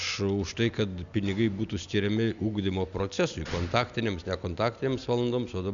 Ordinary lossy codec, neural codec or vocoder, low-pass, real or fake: Opus, 64 kbps; none; 7.2 kHz; real